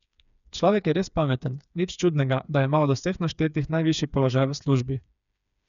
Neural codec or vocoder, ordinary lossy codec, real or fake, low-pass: codec, 16 kHz, 4 kbps, FreqCodec, smaller model; none; fake; 7.2 kHz